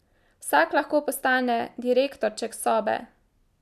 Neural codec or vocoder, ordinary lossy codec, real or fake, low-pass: none; none; real; 14.4 kHz